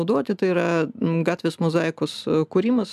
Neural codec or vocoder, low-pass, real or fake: none; 14.4 kHz; real